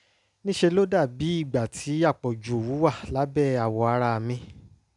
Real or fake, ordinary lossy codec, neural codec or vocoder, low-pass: real; none; none; 10.8 kHz